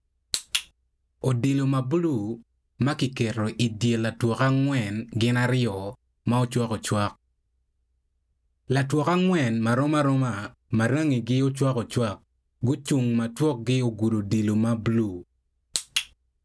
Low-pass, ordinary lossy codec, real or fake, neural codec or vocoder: none; none; real; none